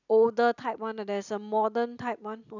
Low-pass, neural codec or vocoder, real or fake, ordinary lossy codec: 7.2 kHz; none; real; none